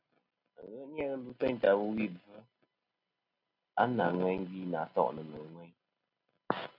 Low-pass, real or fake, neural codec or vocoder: 5.4 kHz; real; none